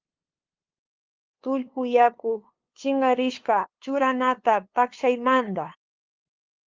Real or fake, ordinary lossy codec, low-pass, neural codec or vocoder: fake; Opus, 32 kbps; 7.2 kHz; codec, 16 kHz, 2 kbps, FunCodec, trained on LibriTTS, 25 frames a second